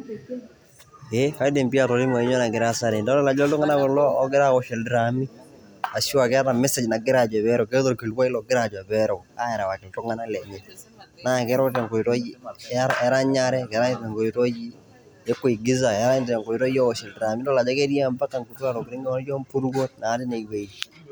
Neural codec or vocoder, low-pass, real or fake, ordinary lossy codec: none; none; real; none